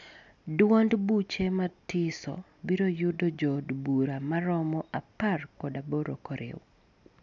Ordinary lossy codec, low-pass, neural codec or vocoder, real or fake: none; 7.2 kHz; none; real